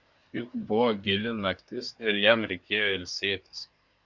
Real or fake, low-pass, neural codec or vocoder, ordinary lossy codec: fake; 7.2 kHz; codec, 24 kHz, 1 kbps, SNAC; MP3, 64 kbps